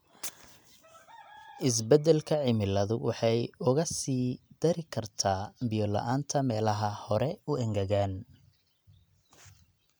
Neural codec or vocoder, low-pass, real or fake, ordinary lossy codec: none; none; real; none